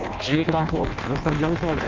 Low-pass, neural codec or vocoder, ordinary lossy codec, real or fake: 7.2 kHz; codec, 16 kHz in and 24 kHz out, 0.6 kbps, FireRedTTS-2 codec; Opus, 24 kbps; fake